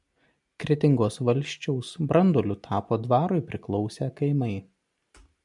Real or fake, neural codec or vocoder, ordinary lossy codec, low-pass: real; none; MP3, 96 kbps; 10.8 kHz